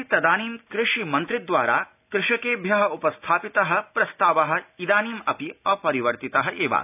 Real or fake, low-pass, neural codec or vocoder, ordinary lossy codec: real; 3.6 kHz; none; none